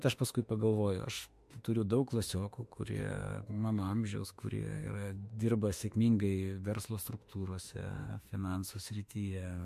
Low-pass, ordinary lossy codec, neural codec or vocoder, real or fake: 14.4 kHz; MP3, 64 kbps; autoencoder, 48 kHz, 32 numbers a frame, DAC-VAE, trained on Japanese speech; fake